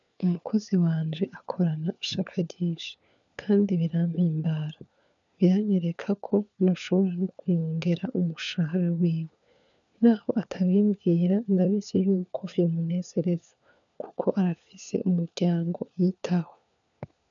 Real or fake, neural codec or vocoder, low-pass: fake; codec, 16 kHz, 4 kbps, FunCodec, trained on LibriTTS, 50 frames a second; 7.2 kHz